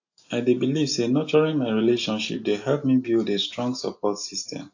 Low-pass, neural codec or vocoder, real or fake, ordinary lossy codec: 7.2 kHz; none; real; AAC, 48 kbps